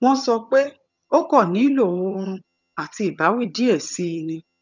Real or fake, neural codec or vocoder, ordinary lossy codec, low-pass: fake; vocoder, 22.05 kHz, 80 mel bands, HiFi-GAN; none; 7.2 kHz